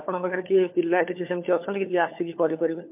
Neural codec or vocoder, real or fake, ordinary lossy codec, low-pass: codec, 16 kHz, 4 kbps, FunCodec, trained on LibriTTS, 50 frames a second; fake; MP3, 32 kbps; 3.6 kHz